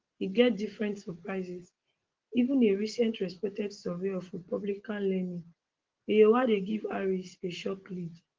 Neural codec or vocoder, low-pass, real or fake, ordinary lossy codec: none; 7.2 kHz; real; Opus, 16 kbps